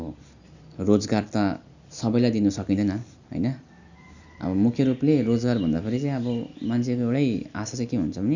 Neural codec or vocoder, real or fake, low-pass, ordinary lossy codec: none; real; 7.2 kHz; none